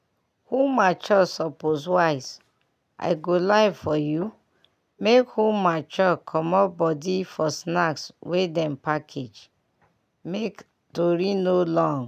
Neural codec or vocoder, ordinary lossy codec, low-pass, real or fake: none; none; 14.4 kHz; real